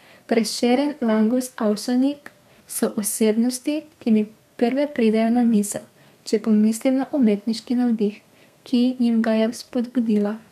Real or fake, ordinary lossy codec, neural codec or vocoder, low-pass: fake; none; codec, 32 kHz, 1.9 kbps, SNAC; 14.4 kHz